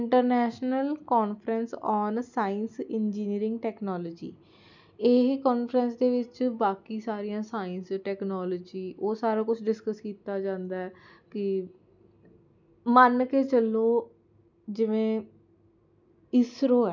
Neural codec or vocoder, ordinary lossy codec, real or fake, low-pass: none; none; real; 7.2 kHz